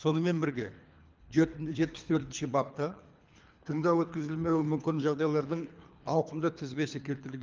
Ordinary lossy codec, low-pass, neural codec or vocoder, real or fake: Opus, 24 kbps; 7.2 kHz; codec, 24 kHz, 3 kbps, HILCodec; fake